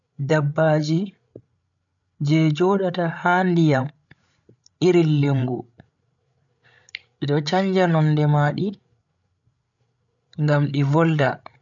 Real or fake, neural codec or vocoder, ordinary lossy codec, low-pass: fake; codec, 16 kHz, 16 kbps, FreqCodec, larger model; none; 7.2 kHz